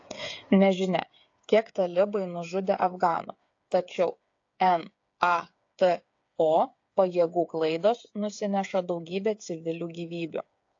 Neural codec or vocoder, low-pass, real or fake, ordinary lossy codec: codec, 16 kHz, 8 kbps, FreqCodec, smaller model; 7.2 kHz; fake; AAC, 48 kbps